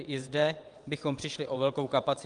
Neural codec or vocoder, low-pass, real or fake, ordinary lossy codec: vocoder, 22.05 kHz, 80 mel bands, WaveNeXt; 9.9 kHz; fake; Opus, 32 kbps